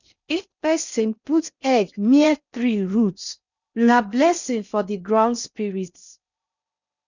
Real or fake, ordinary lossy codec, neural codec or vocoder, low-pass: fake; none; codec, 16 kHz in and 24 kHz out, 0.6 kbps, FocalCodec, streaming, 4096 codes; 7.2 kHz